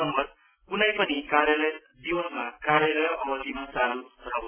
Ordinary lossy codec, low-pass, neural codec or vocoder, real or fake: MP3, 24 kbps; 3.6 kHz; none; real